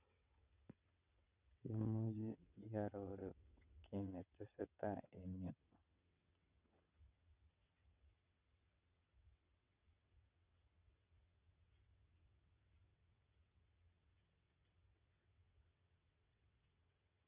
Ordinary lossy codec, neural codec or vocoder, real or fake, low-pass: none; vocoder, 22.05 kHz, 80 mel bands, WaveNeXt; fake; 3.6 kHz